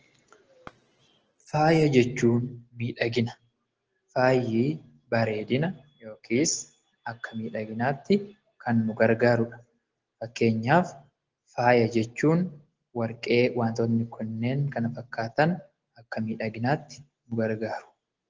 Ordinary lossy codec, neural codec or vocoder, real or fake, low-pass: Opus, 16 kbps; none; real; 7.2 kHz